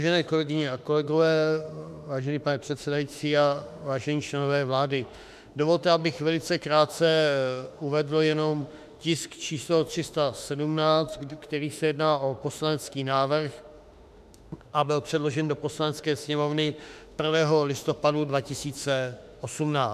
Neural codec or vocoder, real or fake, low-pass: autoencoder, 48 kHz, 32 numbers a frame, DAC-VAE, trained on Japanese speech; fake; 14.4 kHz